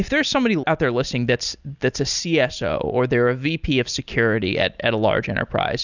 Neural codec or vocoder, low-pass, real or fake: none; 7.2 kHz; real